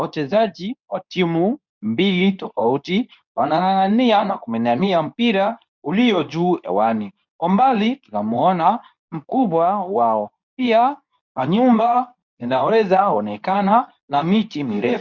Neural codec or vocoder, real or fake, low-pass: codec, 24 kHz, 0.9 kbps, WavTokenizer, medium speech release version 2; fake; 7.2 kHz